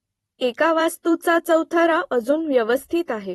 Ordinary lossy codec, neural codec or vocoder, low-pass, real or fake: AAC, 32 kbps; vocoder, 44.1 kHz, 128 mel bands every 256 samples, BigVGAN v2; 19.8 kHz; fake